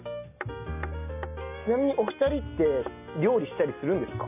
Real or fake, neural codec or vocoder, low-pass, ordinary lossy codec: real; none; 3.6 kHz; none